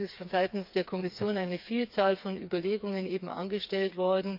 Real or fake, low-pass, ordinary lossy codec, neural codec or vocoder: fake; 5.4 kHz; AAC, 48 kbps; codec, 16 kHz, 4 kbps, FreqCodec, smaller model